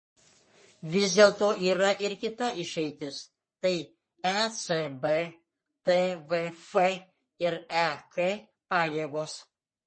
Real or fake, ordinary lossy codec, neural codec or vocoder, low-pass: fake; MP3, 32 kbps; codec, 44.1 kHz, 3.4 kbps, Pupu-Codec; 9.9 kHz